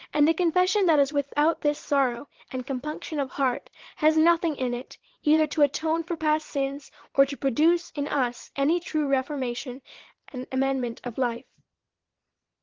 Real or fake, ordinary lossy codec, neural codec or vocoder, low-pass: fake; Opus, 16 kbps; vocoder, 22.05 kHz, 80 mel bands, Vocos; 7.2 kHz